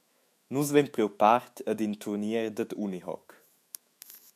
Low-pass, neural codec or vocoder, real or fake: 14.4 kHz; autoencoder, 48 kHz, 128 numbers a frame, DAC-VAE, trained on Japanese speech; fake